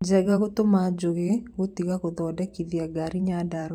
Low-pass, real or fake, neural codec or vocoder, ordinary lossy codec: 19.8 kHz; fake; vocoder, 44.1 kHz, 128 mel bands every 512 samples, BigVGAN v2; none